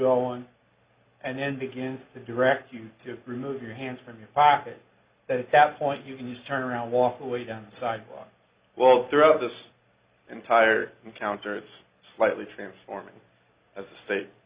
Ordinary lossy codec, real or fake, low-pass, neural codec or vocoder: Opus, 64 kbps; real; 3.6 kHz; none